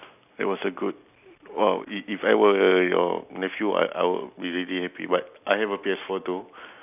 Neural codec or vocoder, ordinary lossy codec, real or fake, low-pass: none; none; real; 3.6 kHz